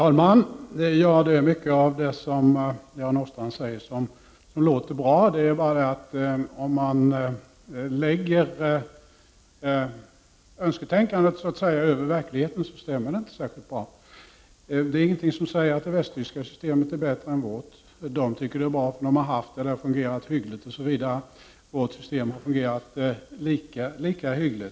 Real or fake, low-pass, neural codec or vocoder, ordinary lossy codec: real; none; none; none